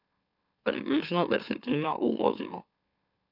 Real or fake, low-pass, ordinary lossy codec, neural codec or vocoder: fake; 5.4 kHz; none; autoencoder, 44.1 kHz, a latent of 192 numbers a frame, MeloTTS